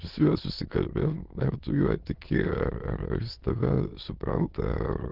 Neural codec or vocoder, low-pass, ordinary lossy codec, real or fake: autoencoder, 22.05 kHz, a latent of 192 numbers a frame, VITS, trained on many speakers; 5.4 kHz; Opus, 16 kbps; fake